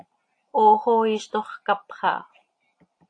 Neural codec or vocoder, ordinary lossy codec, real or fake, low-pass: none; AAC, 48 kbps; real; 9.9 kHz